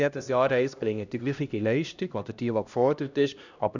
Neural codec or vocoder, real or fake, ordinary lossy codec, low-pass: codec, 16 kHz, 1 kbps, X-Codec, HuBERT features, trained on LibriSpeech; fake; none; 7.2 kHz